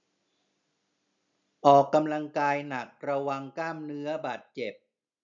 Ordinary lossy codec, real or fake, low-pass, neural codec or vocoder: none; real; 7.2 kHz; none